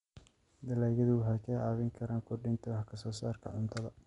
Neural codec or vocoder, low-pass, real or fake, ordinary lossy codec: none; 10.8 kHz; real; none